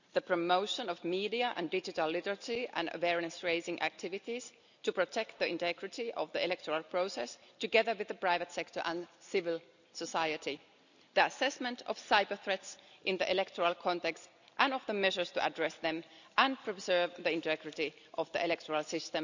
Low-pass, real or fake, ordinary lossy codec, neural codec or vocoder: 7.2 kHz; real; none; none